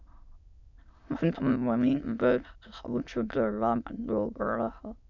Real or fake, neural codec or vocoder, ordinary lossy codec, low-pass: fake; autoencoder, 22.05 kHz, a latent of 192 numbers a frame, VITS, trained on many speakers; none; 7.2 kHz